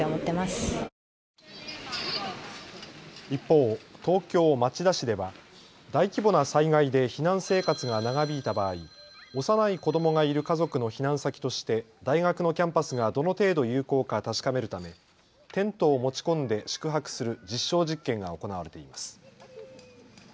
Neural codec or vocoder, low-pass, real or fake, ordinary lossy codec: none; none; real; none